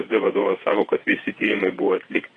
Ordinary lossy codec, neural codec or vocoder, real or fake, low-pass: AAC, 32 kbps; vocoder, 22.05 kHz, 80 mel bands, WaveNeXt; fake; 9.9 kHz